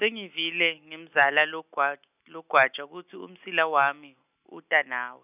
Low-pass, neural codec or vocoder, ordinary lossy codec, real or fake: 3.6 kHz; none; none; real